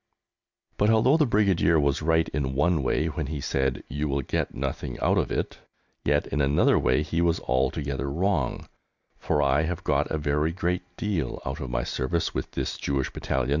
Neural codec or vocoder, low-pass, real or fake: none; 7.2 kHz; real